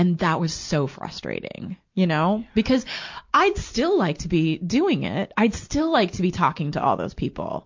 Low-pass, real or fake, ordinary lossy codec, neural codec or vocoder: 7.2 kHz; real; MP3, 48 kbps; none